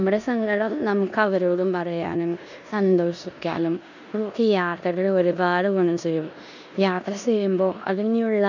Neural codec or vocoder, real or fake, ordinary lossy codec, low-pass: codec, 16 kHz in and 24 kHz out, 0.9 kbps, LongCat-Audio-Codec, four codebook decoder; fake; none; 7.2 kHz